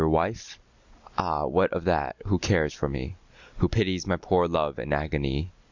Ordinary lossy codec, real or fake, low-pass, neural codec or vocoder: Opus, 64 kbps; real; 7.2 kHz; none